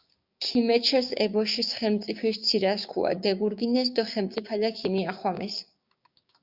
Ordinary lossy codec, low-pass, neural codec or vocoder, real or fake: Opus, 64 kbps; 5.4 kHz; codec, 16 kHz, 6 kbps, DAC; fake